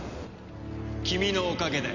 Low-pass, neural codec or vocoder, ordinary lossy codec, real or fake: 7.2 kHz; none; none; real